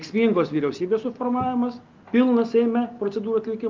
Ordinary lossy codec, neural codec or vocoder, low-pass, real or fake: Opus, 24 kbps; none; 7.2 kHz; real